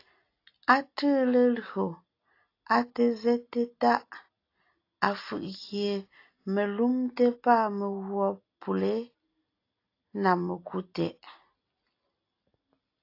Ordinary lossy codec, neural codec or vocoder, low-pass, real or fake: AAC, 32 kbps; none; 5.4 kHz; real